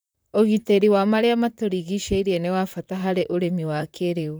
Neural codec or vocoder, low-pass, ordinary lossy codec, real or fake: vocoder, 44.1 kHz, 128 mel bands, Pupu-Vocoder; none; none; fake